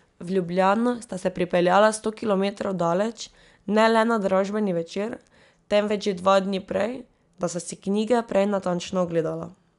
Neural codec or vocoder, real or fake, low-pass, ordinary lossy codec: none; real; 10.8 kHz; none